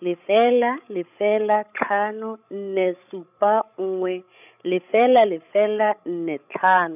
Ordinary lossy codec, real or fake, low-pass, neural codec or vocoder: none; fake; 3.6 kHz; codec, 16 kHz, 16 kbps, FreqCodec, larger model